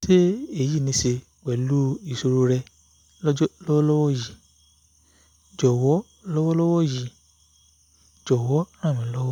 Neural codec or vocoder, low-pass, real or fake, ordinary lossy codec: none; 19.8 kHz; real; none